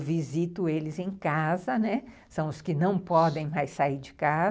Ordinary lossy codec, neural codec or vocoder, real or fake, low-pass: none; none; real; none